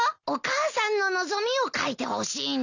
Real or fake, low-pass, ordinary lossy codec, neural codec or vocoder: real; 7.2 kHz; none; none